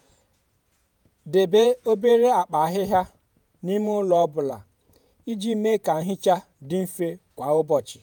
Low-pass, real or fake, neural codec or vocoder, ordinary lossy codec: none; fake; vocoder, 48 kHz, 128 mel bands, Vocos; none